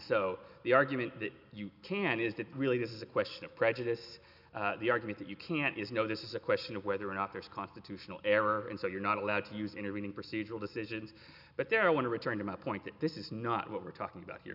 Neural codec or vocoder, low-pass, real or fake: none; 5.4 kHz; real